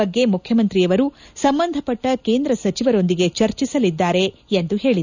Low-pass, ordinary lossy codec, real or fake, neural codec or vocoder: 7.2 kHz; none; real; none